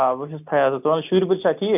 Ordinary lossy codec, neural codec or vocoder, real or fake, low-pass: none; none; real; 3.6 kHz